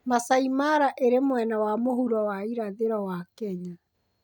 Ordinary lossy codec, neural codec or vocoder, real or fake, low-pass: none; none; real; none